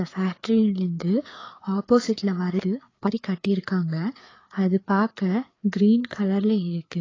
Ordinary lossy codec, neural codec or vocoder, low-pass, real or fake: AAC, 32 kbps; codec, 16 kHz, 4 kbps, FreqCodec, larger model; 7.2 kHz; fake